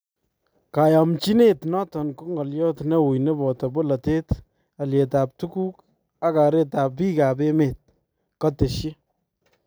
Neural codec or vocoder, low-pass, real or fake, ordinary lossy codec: none; none; real; none